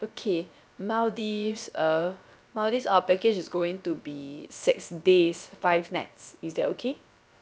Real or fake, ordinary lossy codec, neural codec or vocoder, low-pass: fake; none; codec, 16 kHz, about 1 kbps, DyCAST, with the encoder's durations; none